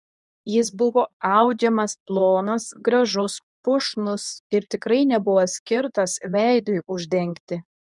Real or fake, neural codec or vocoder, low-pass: fake; codec, 24 kHz, 0.9 kbps, WavTokenizer, medium speech release version 2; 10.8 kHz